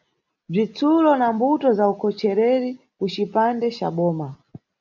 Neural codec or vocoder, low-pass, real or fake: none; 7.2 kHz; real